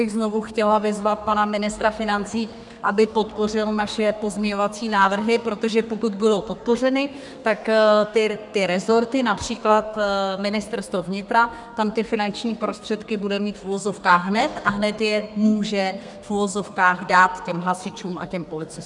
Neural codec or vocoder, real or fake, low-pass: codec, 32 kHz, 1.9 kbps, SNAC; fake; 10.8 kHz